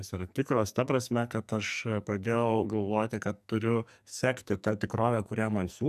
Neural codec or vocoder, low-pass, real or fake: codec, 44.1 kHz, 2.6 kbps, SNAC; 14.4 kHz; fake